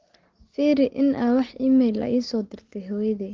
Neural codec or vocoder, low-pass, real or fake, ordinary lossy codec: none; 7.2 kHz; real; Opus, 16 kbps